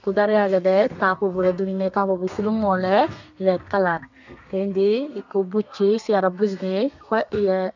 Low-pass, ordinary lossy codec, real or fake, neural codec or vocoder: 7.2 kHz; none; fake; codec, 32 kHz, 1.9 kbps, SNAC